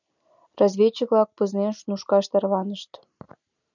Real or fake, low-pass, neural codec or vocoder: real; 7.2 kHz; none